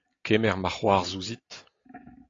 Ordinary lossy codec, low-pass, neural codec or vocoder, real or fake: AAC, 32 kbps; 7.2 kHz; none; real